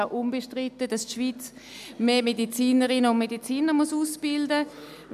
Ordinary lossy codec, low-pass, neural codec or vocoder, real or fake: none; 14.4 kHz; none; real